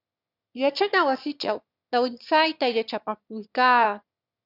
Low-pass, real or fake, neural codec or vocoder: 5.4 kHz; fake; autoencoder, 22.05 kHz, a latent of 192 numbers a frame, VITS, trained on one speaker